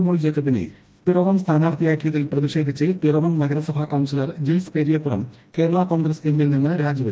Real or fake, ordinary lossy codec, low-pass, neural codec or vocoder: fake; none; none; codec, 16 kHz, 1 kbps, FreqCodec, smaller model